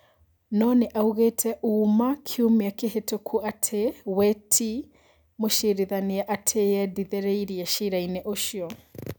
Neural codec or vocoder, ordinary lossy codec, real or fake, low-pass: none; none; real; none